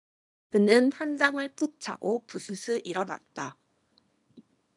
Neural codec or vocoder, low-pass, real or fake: codec, 24 kHz, 0.9 kbps, WavTokenizer, small release; 10.8 kHz; fake